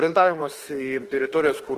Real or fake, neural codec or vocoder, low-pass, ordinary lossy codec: fake; autoencoder, 48 kHz, 32 numbers a frame, DAC-VAE, trained on Japanese speech; 14.4 kHz; Opus, 16 kbps